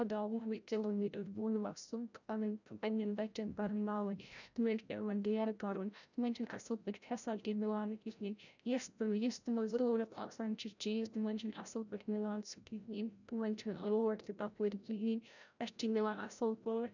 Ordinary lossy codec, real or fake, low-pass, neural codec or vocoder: none; fake; 7.2 kHz; codec, 16 kHz, 0.5 kbps, FreqCodec, larger model